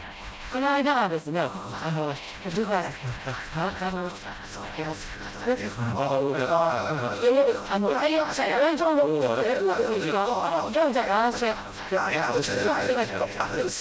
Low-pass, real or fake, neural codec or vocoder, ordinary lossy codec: none; fake; codec, 16 kHz, 0.5 kbps, FreqCodec, smaller model; none